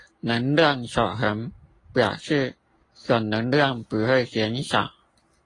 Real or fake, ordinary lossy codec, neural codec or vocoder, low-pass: real; AAC, 32 kbps; none; 10.8 kHz